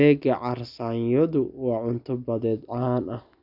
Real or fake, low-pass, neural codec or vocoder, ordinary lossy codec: real; 5.4 kHz; none; none